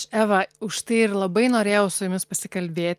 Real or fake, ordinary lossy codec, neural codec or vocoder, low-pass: real; Opus, 32 kbps; none; 14.4 kHz